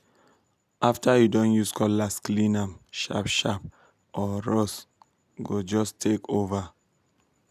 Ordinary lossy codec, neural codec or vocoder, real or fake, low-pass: none; none; real; 14.4 kHz